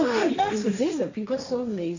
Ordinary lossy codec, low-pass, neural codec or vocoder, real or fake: none; 7.2 kHz; codec, 16 kHz, 1.1 kbps, Voila-Tokenizer; fake